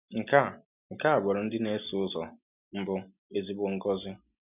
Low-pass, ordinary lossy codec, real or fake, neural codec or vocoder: 3.6 kHz; none; real; none